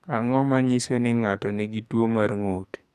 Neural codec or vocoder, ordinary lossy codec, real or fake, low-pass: codec, 44.1 kHz, 2.6 kbps, SNAC; none; fake; 14.4 kHz